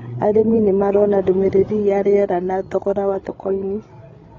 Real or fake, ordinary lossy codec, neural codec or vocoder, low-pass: fake; AAC, 32 kbps; codec, 16 kHz, 8 kbps, FreqCodec, larger model; 7.2 kHz